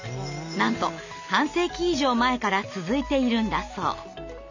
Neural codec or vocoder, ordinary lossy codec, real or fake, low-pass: none; none; real; 7.2 kHz